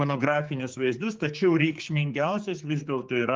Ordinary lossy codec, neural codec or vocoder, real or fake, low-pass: Opus, 32 kbps; codec, 16 kHz, 4 kbps, X-Codec, HuBERT features, trained on general audio; fake; 7.2 kHz